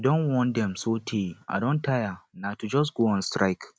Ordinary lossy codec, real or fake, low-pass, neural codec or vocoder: none; real; none; none